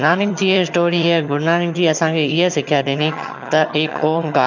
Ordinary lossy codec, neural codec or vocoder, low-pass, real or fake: none; vocoder, 22.05 kHz, 80 mel bands, HiFi-GAN; 7.2 kHz; fake